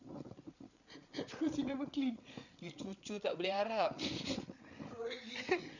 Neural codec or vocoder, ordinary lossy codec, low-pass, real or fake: codec, 16 kHz, 8 kbps, FreqCodec, larger model; none; 7.2 kHz; fake